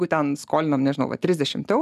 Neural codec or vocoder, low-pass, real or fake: none; 14.4 kHz; real